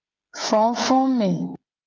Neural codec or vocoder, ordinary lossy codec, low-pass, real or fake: codec, 16 kHz, 16 kbps, FreqCodec, smaller model; Opus, 24 kbps; 7.2 kHz; fake